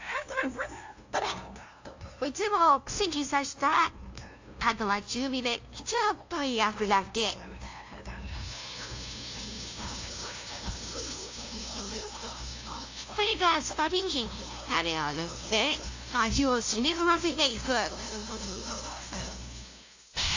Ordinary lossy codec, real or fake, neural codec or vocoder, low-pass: AAC, 48 kbps; fake; codec, 16 kHz, 0.5 kbps, FunCodec, trained on LibriTTS, 25 frames a second; 7.2 kHz